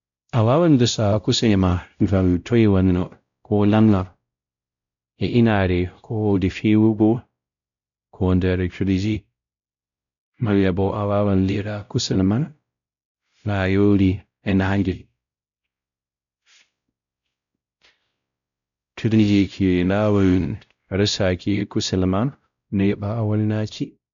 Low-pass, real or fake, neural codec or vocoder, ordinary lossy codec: 7.2 kHz; fake; codec, 16 kHz, 0.5 kbps, X-Codec, WavLM features, trained on Multilingual LibriSpeech; none